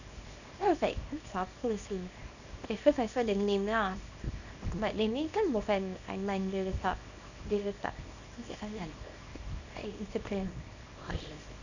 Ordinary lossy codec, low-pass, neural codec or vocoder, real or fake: none; 7.2 kHz; codec, 24 kHz, 0.9 kbps, WavTokenizer, small release; fake